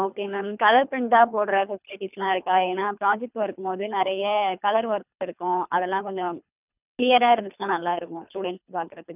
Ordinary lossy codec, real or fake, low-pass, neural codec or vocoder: none; fake; 3.6 kHz; codec, 24 kHz, 3 kbps, HILCodec